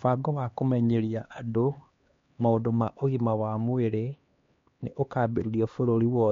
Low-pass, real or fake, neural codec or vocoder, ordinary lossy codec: 7.2 kHz; fake; codec, 16 kHz, 2 kbps, X-Codec, HuBERT features, trained on LibriSpeech; MP3, 48 kbps